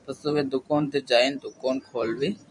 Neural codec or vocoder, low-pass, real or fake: vocoder, 44.1 kHz, 128 mel bands every 512 samples, BigVGAN v2; 10.8 kHz; fake